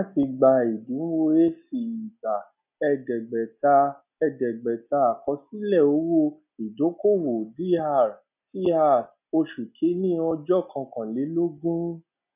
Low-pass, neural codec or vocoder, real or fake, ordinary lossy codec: 3.6 kHz; none; real; none